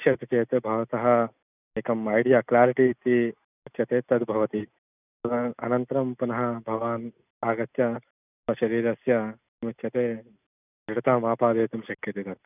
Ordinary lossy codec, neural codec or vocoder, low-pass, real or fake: none; none; 3.6 kHz; real